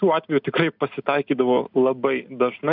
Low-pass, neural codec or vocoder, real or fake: 5.4 kHz; none; real